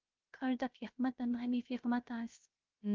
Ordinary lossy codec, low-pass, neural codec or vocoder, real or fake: Opus, 32 kbps; 7.2 kHz; codec, 16 kHz, 0.3 kbps, FocalCodec; fake